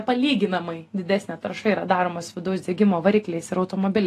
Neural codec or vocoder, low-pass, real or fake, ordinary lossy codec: none; 14.4 kHz; real; AAC, 48 kbps